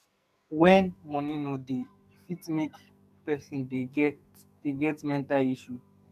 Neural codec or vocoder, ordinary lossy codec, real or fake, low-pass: codec, 44.1 kHz, 2.6 kbps, SNAC; none; fake; 14.4 kHz